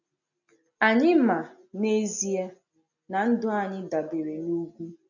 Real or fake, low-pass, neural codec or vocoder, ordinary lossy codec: real; 7.2 kHz; none; none